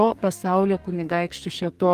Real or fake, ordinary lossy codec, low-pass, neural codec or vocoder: fake; Opus, 32 kbps; 14.4 kHz; codec, 32 kHz, 1.9 kbps, SNAC